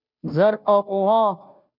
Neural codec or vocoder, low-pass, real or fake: codec, 16 kHz, 0.5 kbps, FunCodec, trained on Chinese and English, 25 frames a second; 5.4 kHz; fake